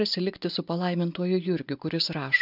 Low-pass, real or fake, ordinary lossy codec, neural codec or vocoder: 5.4 kHz; real; AAC, 48 kbps; none